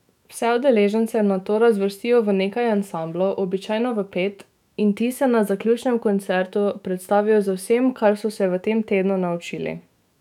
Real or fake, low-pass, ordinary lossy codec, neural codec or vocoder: fake; 19.8 kHz; none; autoencoder, 48 kHz, 128 numbers a frame, DAC-VAE, trained on Japanese speech